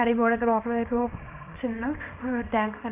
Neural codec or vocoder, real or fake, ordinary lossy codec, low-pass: codec, 24 kHz, 0.9 kbps, WavTokenizer, small release; fake; none; 3.6 kHz